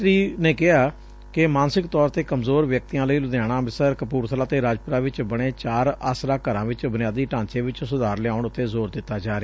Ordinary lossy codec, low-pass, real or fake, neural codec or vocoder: none; none; real; none